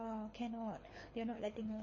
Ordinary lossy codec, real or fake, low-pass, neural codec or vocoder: MP3, 32 kbps; fake; 7.2 kHz; codec, 24 kHz, 6 kbps, HILCodec